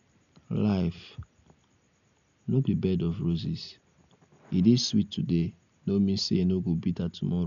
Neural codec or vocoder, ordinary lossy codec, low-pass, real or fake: none; none; 7.2 kHz; real